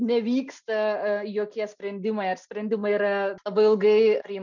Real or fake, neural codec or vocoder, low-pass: real; none; 7.2 kHz